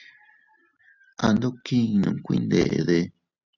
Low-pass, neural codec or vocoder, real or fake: 7.2 kHz; none; real